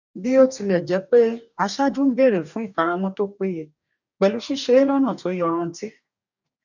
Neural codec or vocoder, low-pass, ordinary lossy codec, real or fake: codec, 44.1 kHz, 2.6 kbps, DAC; 7.2 kHz; none; fake